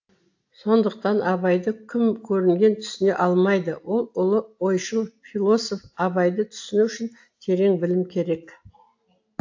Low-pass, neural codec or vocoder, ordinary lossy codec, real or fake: 7.2 kHz; none; AAC, 48 kbps; real